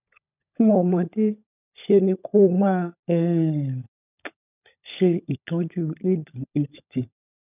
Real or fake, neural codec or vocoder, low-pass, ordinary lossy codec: fake; codec, 16 kHz, 16 kbps, FunCodec, trained on LibriTTS, 50 frames a second; 3.6 kHz; none